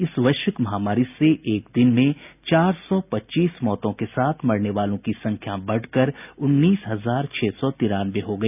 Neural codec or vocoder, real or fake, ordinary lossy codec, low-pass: none; real; none; 3.6 kHz